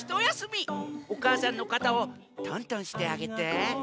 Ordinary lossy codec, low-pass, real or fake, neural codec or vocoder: none; none; real; none